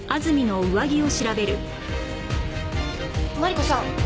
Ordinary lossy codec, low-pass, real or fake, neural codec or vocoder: none; none; real; none